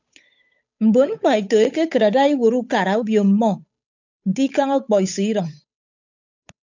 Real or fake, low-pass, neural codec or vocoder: fake; 7.2 kHz; codec, 16 kHz, 8 kbps, FunCodec, trained on Chinese and English, 25 frames a second